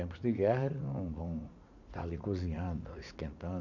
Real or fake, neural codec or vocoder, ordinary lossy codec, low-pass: fake; vocoder, 44.1 kHz, 80 mel bands, Vocos; none; 7.2 kHz